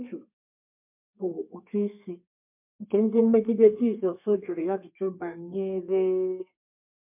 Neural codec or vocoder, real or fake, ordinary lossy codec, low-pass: codec, 32 kHz, 1.9 kbps, SNAC; fake; MP3, 32 kbps; 3.6 kHz